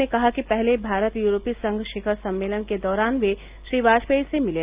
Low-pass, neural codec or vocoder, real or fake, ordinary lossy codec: 3.6 kHz; none; real; Opus, 32 kbps